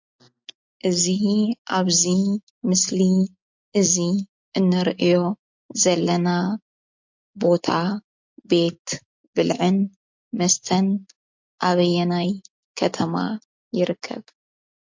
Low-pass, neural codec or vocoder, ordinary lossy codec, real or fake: 7.2 kHz; none; MP3, 48 kbps; real